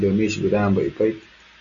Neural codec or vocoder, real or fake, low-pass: none; real; 7.2 kHz